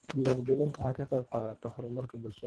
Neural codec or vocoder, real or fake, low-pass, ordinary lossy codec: codec, 24 kHz, 1.5 kbps, HILCodec; fake; 10.8 kHz; Opus, 16 kbps